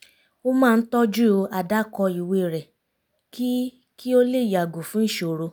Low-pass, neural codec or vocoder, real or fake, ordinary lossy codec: none; none; real; none